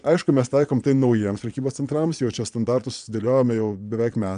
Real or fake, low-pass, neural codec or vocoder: fake; 9.9 kHz; vocoder, 22.05 kHz, 80 mel bands, Vocos